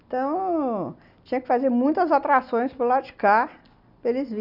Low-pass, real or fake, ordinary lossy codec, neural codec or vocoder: 5.4 kHz; real; none; none